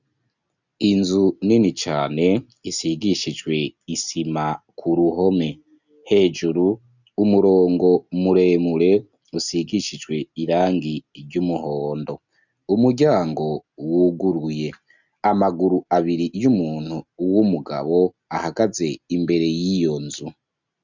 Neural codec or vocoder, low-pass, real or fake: none; 7.2 kHz; real